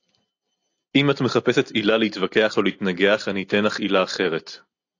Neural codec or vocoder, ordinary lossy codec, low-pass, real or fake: none; AAC, 48 kbps; 7.2 kHz; real